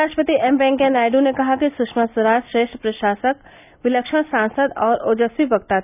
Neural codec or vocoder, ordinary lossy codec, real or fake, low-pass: none; none; real; 3.6 kHz